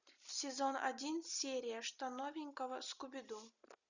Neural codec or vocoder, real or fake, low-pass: none; real; 7.2 kHz